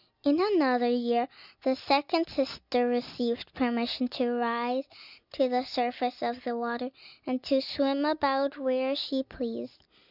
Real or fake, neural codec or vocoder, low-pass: real; none; 5.4 kHz